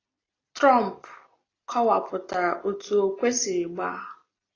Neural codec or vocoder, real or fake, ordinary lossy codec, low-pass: none; real; AAC, 32 kbps; 7.2 kHz